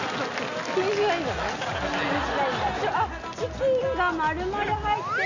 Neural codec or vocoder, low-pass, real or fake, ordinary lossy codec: none; 7.2 kHz; real; none